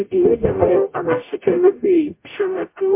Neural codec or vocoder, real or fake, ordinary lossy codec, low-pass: codec, 44.1 kHz, 0.9 kbps, DAC; fake; MP3, 24 kbps; 3.6 kHz